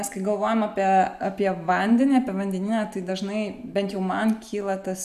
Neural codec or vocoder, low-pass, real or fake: none; 14.4 kHz; real